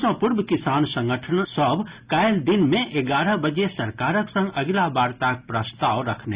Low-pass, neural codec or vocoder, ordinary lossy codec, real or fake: 3.6 kHz; none; Opus, 64 kbps; real